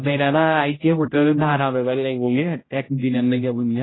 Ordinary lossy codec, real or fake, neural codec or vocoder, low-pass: AAC, 16 kbps; fake; codec, 16 kHz, 0.5 kbps, X-Codec, HuBERT features, trained on general audio; 7.2 kHz